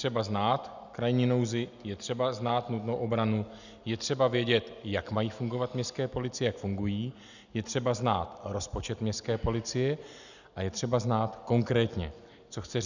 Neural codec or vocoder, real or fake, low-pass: none; real; 7.2 kHz